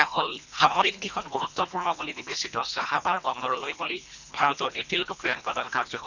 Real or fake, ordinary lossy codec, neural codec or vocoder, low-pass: fake; none; codec, 24 kHz, 1.5 kbps, HILCodec; 7.2 kHz